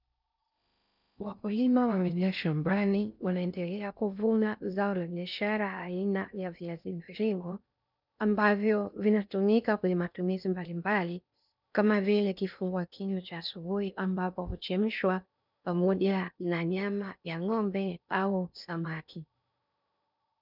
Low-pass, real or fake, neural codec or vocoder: 5.4 kHz; fake; codec, 16 kHz in and 24 kHz out, 0.6 kbps, FocalCodec, streaming, 4096 codes